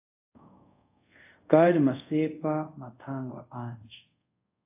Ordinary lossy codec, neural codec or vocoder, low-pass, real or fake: AAC, 24 kbps; codec, 24 kHz, 0.5 kbps, DualCodec; 3.6 kHz; fake